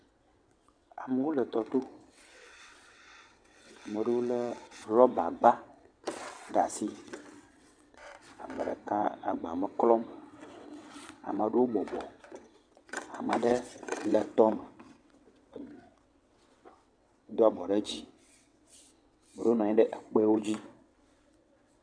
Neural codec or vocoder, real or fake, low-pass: vocoder, 22.05 kHz, 80 mel bands, WaveNeXt; fake; 9.9 kHz